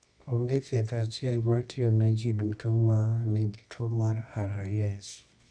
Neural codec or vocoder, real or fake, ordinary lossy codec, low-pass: codec, 24 kHz, 0.9 kbps, WavTokenizer, medium music audio release; fake; none; 9.9 kHz